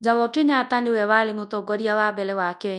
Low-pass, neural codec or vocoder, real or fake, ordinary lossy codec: 10.8 kHz; codec, 24 kHz, 0.9 kbps, WavTokenizer, large speech release; fake; none